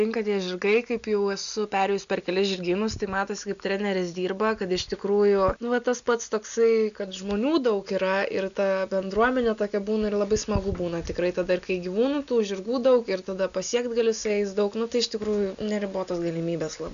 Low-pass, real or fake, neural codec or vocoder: 7.2 kHz; real; none